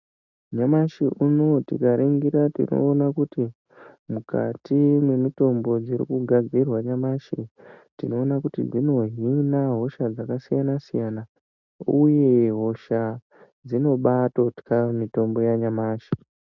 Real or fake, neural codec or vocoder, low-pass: real; none; 7.2 kHz